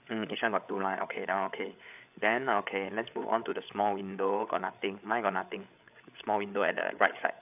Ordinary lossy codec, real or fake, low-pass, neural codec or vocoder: AAC, 32 kbps; fake; 3.6 kHz; codec, 16 kHz, 8 kbps, FreqCodec, larger model